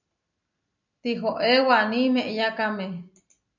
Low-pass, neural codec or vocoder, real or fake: 7.2 kHz; none; real